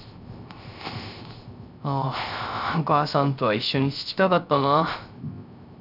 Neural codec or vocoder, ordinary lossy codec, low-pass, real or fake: codec, 16 kHz, 0.3 kbps, FocalCodec; none; 5.4 kHz; fake